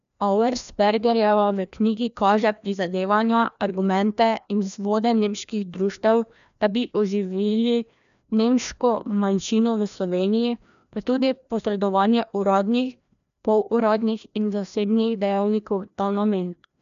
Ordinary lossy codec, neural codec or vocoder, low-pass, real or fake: none; codec, 16 kHz, 1 kbps, FreqCodec, larger model; 7.2 kHz; fake